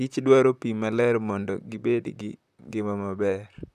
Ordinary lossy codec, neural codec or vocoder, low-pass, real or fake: none; vocoder, 44.1 kHz, 128 mel bands, Pupu-Vocoder; 14.4 kHz; fake